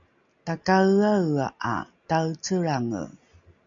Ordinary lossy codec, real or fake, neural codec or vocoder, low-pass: MP3, 64 kbps; real; none; 7.2 kHz